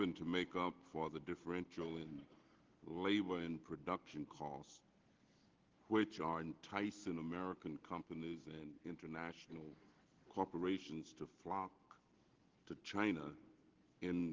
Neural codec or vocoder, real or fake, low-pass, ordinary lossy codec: none; real; 7.2 kHz; Opus, 16 kbps